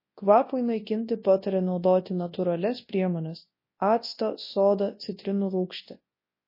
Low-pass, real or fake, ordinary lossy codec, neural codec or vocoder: 5.4 kHz; fake; MP3, 24 kbps; codec, 24 kHz, 0.9 kbps, WavTokenizer, large speech release